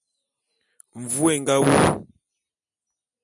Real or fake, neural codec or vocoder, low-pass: real; none; 10.8 kHz